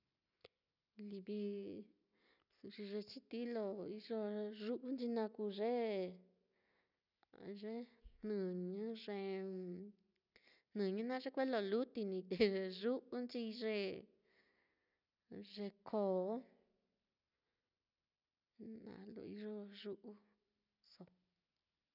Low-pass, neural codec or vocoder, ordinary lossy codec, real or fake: 5.4 kHz; none; none; real